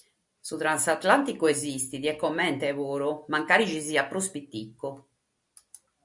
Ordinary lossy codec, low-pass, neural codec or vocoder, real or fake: MP3, 64 kbps; 10.8 kHz; none; real